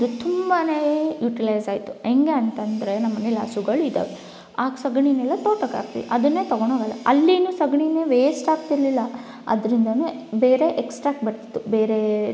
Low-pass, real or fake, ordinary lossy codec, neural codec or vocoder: none; real; none; none